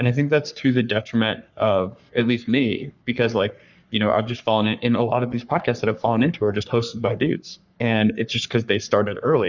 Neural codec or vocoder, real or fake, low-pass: codec, 44.1 kHz, 3.4 kbps, Pupu-Codec; fake; 7.2 kHz